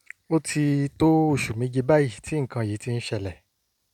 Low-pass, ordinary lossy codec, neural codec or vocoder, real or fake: 19.8 kHz; none; none; real